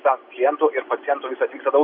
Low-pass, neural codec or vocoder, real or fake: 5.4 kHz; none; real